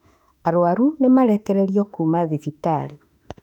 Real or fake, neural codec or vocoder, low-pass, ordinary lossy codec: fake; autoencoder, 48 kHz, 32 numbers a frame, DAC-VAE, trained on Japanese speech; 19.8 kHz; none